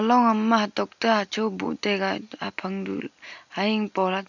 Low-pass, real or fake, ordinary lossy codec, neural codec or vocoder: 7.2 kHz; real; none; none